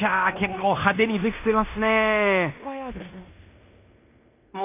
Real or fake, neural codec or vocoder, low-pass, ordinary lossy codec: fake; codec, 16 kHz in and 24 kHz out, 0.9 kbps, LongCat-Audio-Codec, fine tuned four codebook decoder; 3.6 kHz; AAC, 32 kbps